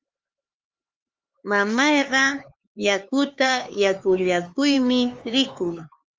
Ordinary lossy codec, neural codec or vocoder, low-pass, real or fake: Opus, 32 kbps; codec, 16 kHz, 4 kbps, X-Codec, HuBERT features, trained on LibriSpeech; 7.2 kHz; fake